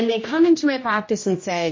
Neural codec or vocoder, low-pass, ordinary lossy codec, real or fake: codec, 16 kHz, 1 kbps, X-Codec, HuBERT features, trained on general audio; 7.2 kHz; MP3, 32 kbps; fake